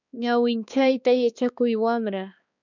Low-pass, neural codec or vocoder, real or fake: 7.2 kHz; codec, 16 kHz, 2 kbps, X-Codec, HuBERT features, trained on balanced general audio; fake